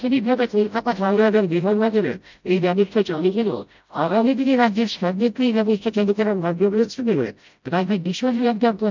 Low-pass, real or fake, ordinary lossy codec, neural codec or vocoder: 7.2 kHz; fake; MP3, 64 kbps; codec, 16 kHz, 0.5 kbps, FreqCodec, smaller model